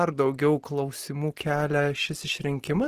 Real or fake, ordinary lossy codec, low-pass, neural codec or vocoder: real; Opus, 16 kbps; 14.4 kHz; none